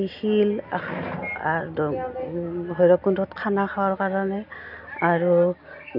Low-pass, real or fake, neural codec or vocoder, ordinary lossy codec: 5.4 kHz; real; none; none